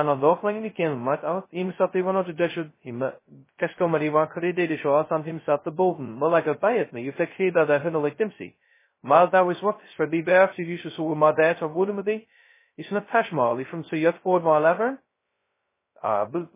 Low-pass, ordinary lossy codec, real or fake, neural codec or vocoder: 3.6 kHz; MP3, 16 kbps; fake; codec, 16 kHz, 0.2 kbps, FocalCodec